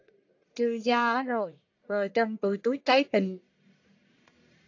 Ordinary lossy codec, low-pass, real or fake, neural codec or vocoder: AAC, 48 kbps; 7.2 kHz; fake; codec, 44.1 kHz, 1.7 kbps, Pupu-Codec